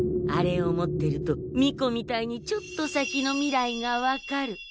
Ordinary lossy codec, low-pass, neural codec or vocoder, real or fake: none; none; none; real